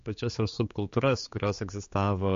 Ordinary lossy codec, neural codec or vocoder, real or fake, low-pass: MP3, 48 kbps; codec, 16 kHz, 4 kbps, X-Codec, HuBERT features, trained on general audio; fake; 7.2 kHz